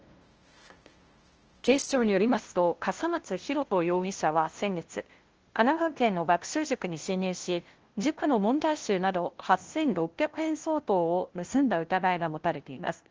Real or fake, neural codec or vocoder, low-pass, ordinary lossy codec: fake; codec, 16 kHz, 0.5 kbps, FunCodec, trained on LibriTTS, 25 frames a second; 7.2 kHz; Opus, 16 kbps